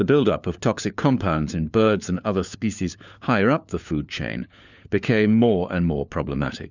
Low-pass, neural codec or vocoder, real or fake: 7.2 kHz; codec, 16 kHz, 4 kbps, FunCodec, trained on LibriTTS, 50 frames a second; fake